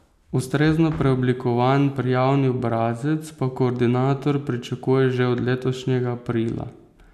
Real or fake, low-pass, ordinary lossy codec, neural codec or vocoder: real; 14.4 kHz; none; none